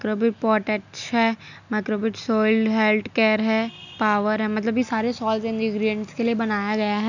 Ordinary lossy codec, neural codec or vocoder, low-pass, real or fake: none; none; 7.2 kHz; real